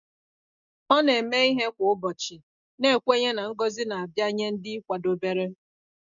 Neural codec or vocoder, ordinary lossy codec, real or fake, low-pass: none; none; real; 7.2 kHz